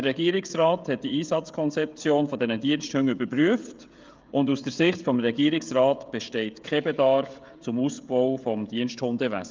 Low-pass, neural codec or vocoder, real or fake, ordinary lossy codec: 7.2 kHz; codec, 16 kHz, 16 kbps, FreqCodec, smaller model; fake; Opus, 24 kbps